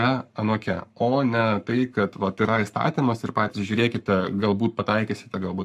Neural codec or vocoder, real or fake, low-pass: codec, 44.1 kHz, 7.8 kbps, Pupu-Codec; fake; 14.4 kHz